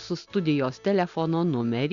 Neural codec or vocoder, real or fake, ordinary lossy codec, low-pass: none; real; Opus, 64 kbps; 7.2 kHz